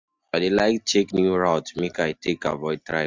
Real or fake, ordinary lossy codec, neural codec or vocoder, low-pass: real; MP3, 64 kbps; none; 7.2 kHz